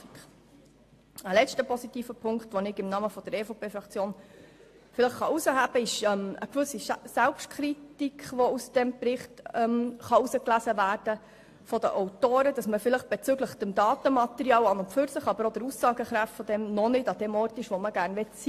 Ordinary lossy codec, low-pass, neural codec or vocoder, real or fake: AAC, 64 kbps; 14.4 kHz; none; real